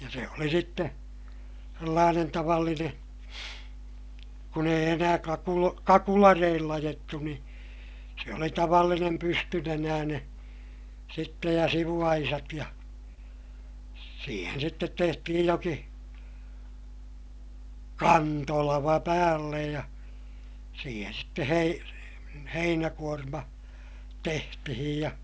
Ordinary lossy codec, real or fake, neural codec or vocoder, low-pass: none; real; none; none